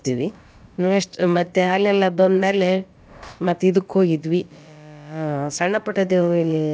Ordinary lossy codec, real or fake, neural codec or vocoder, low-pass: none; fake; codec, 16 kHz, about 1 kbps, DyCAST, with the encoder's durations; none